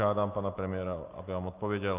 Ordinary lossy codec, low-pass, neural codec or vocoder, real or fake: Opus, 24 kbps; 3.6 kHz; none; real